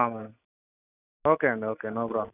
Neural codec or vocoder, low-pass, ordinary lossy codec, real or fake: none; 3.6 kHz; none; real